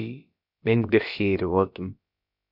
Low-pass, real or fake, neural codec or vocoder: 5.4 kHz; fake; codec, 16 kHz, about 1 kbps, DyCAST, with the encoder's durations